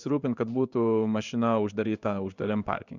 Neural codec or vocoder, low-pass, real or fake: codec, 16 kHz in and 24 kHz out, 1 kbps, XY-Tokenizer; 7.2 kHz; fake